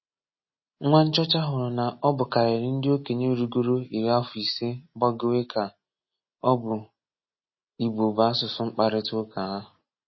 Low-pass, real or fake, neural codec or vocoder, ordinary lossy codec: 7.2 kHz; real; none; MP3, 24 kbps